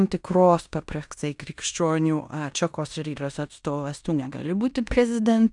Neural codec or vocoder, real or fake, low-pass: codec, 16 kHz in and 24 kHz out, 0.9 kbps, LongCat-Audio-Codec, fine tuned four codebook decoder; fake; 10.8 kHz